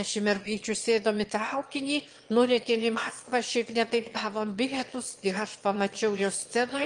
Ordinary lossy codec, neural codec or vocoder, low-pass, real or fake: Opus, 24 kbps; autoencoder, 22.05 kHz, a latent of 192 numbers a frame, VITS, trained on one speaker; 9.9 kHz; fake